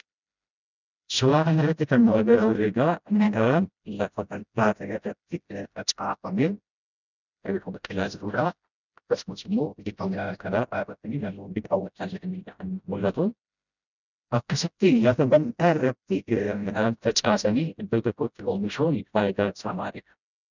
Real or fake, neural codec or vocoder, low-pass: fake; codec, 16 kHz, 0.5 kbps, FreqCodec, smaller model; 7.2 kHz